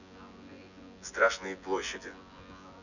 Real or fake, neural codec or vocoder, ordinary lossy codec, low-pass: fake; vocoder, 24 kHz, 100 mel bands, Vocos; none; 7.2 kHz